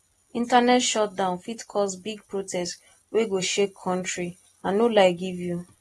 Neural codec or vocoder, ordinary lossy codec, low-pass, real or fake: none; AAC, 32 kbps; 19.8 kHz; real